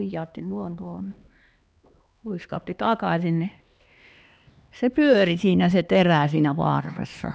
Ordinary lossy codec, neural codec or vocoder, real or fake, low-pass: none; codec, 16 kHz, 2 kbps, X-Codec, HuBERT features, trained on LibriSpeech; fake; none